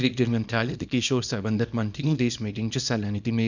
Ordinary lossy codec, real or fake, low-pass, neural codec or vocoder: none; fake; 7.2 kHz; codec, 24 kHz, 0.9 kbps, WavTokenizer, small release